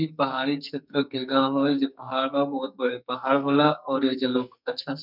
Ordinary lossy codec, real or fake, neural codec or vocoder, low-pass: none; fake; codec, 44.1 kHz, 2.6 kbps, SNAC; 5.4 kHz